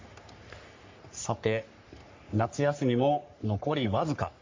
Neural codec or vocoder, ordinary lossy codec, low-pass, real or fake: codec, 44.1 kHz, 3.4 kbps, Pupu-Codec; MP3, 48 kbps; 7.2 kHz; fake